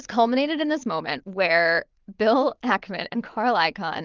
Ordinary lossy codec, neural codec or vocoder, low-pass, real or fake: Opus, 24 kbps; vocoder, 22.05 kHz, 80 mel bands, Vocos; 7.2 kHz; fake